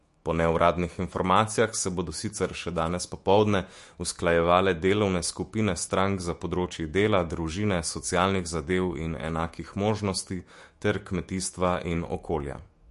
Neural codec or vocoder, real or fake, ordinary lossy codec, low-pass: autoencoder, 48 kHz, 128 numbers a frame, DAC-VAE, trained on Japanese speech; fake; MP3, 48 kbps; 14.4 kHz